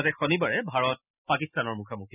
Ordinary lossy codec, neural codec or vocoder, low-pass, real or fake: none; none; 3.6 kHz; real